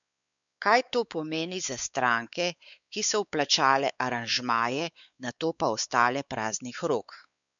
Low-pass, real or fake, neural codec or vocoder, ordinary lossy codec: 7.2 kHz; fake; codec, 16 kHz, 4 kbps, X-Codec, WavLM features, trained on Multilingual LibriSpeech; MP3, 96 kbps